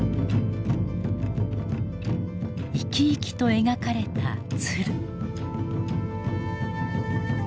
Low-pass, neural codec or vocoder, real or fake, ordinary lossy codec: none; none; real; none